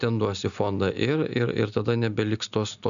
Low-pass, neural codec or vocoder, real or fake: 7.2 kHz; none; real